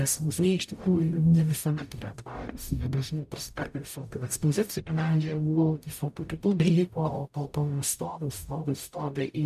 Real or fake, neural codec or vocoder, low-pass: fake; codec, 44.1 kHz, 0.9 kbps, DAC; 14.4 kHz